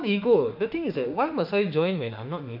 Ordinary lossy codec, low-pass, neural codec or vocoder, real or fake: none; 5.4 kHz; autoencoder, 48 kHz, 32 numbers a frame, DAC-VAE, trained on Japanese speech; fake